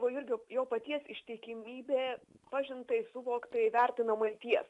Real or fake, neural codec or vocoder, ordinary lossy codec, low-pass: real; none; AAC, 64 kbps; 10.8 kHz